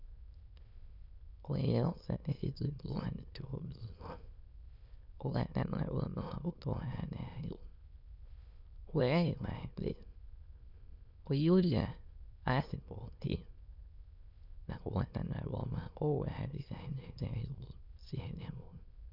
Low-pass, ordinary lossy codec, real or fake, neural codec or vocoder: 5.4 kHz; none; fake; autoencoder, 22.05 kHz, a latent of 192 numbers a frame, VITS, trained on many speakers